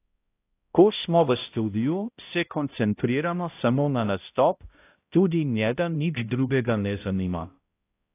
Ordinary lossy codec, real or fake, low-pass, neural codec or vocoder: AAC, 24 kbps; fake; 3.6 kHz; codec, 16 kHz, 0.5 kbps, X-Codec, HuBERT features, trained on balanced general audio